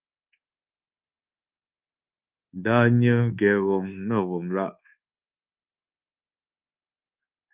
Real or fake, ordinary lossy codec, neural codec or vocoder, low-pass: fake; Opus, 24 kbps; codec, 24 kHz, 1.2 kbps, DualCodec; 3.6 kHz